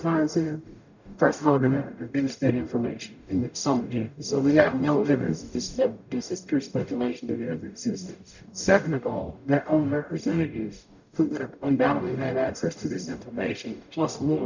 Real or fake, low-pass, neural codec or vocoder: fake; 7.2 kHz; codec, 44.1 kHz, 0.9 kbps, DAC